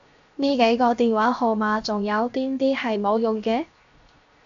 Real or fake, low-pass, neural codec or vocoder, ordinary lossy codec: fake; 7.2 kHz; codec, 16 kHz, 0.7 kbps, FocalCodec; AAC, 64 kbps